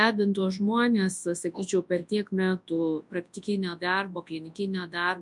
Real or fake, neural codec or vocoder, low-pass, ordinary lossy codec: fake; codec, 24 kHz, 0.9 kbps, WavTokenizer, large speech release; 10.8 kHz; MP3, 64 kbps